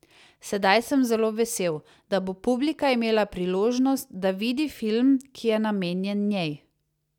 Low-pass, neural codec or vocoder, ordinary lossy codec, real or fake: 19.8 kHz; none; none; real